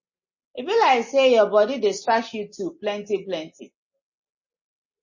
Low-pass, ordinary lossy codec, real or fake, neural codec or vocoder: 7.2 kHz; MP3, 32 kbps; real; none